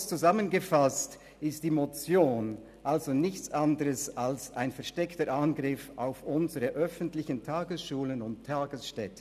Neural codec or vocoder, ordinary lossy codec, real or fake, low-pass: none; none; real; 14.4 kHz